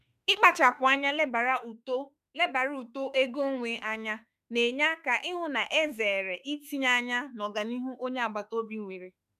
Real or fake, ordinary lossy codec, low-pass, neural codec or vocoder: fake; none; 14.4 kHz; autoencoder, 48 kHz, 32 numbers a frame, DAC-VAE, trained on Japanese speech